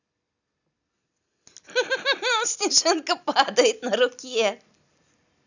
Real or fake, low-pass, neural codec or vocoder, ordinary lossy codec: real; 7.2 kHz; none; none